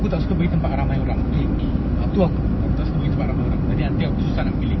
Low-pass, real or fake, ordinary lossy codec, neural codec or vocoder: 7.2 kHz; real; MP3, 24 kbps; none